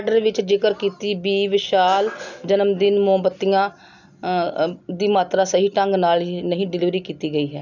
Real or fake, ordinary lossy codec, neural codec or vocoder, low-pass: real; none; none; 7.2 kHz